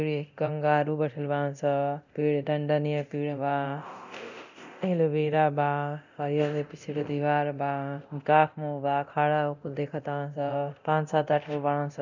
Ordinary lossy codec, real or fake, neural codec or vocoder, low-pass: none; fake; codec, 24 kHz, 0.9 kbps, DualCodec; 7.2 kHz